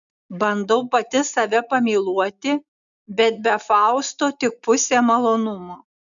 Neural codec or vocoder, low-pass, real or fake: none; 7.2 kHz; real